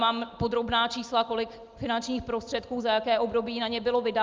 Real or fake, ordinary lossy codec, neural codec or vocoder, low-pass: real; Opus, 24 kbps; none; 7.2 kHz